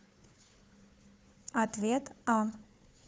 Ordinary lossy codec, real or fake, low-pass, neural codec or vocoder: none; fake; none; codec, 16 kHz, 4 kbps, FunCodec, trained on Chinese and English, 50 frames a second